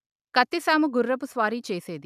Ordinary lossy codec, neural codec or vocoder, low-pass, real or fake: none; none; 14.4 kHz; real